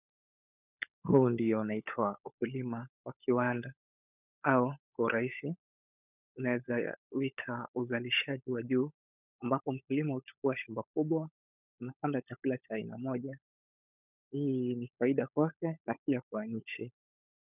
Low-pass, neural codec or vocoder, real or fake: 3.6 kHz; codec, 16 kHz, 16 kbps, FunCodec, trained on LibriTTS, 50 frames a second; fake